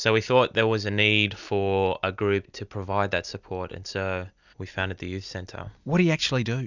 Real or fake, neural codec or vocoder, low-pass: real; none; 7.2 kHz